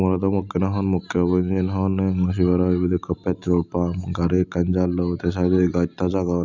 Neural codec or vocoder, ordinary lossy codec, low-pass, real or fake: none; none; 7.2 kHz; real